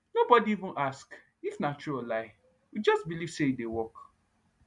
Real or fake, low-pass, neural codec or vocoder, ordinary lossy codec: real; 10.8 kHz; none; MP3, 64 kbps